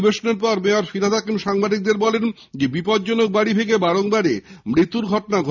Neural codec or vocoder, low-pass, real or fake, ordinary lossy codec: none; 7.2 kHz; real; none